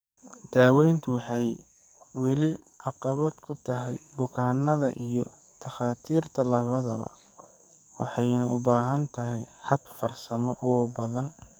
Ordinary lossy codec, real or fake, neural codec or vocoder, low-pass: none; fake; codec, 44.1 kHz, 2.6 kbps, SNAC; none